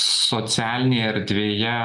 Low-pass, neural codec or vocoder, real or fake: 10.8 kHz; none; real